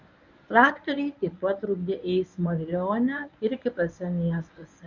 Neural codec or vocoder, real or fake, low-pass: codec, 24 kHz, 0.9 kbps, WavTokenizer, medium speech release version 1; fake; 7.2 kHz